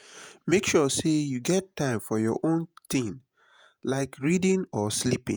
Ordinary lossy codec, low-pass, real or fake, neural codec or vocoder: none; none; fake; vocoder, 48 kHz, 128 mel bands, Vocos